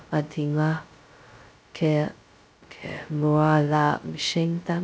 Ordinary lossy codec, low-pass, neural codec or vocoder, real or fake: none; none; codec, 16 kHz, 0.2 kbps, FocalCodec; fake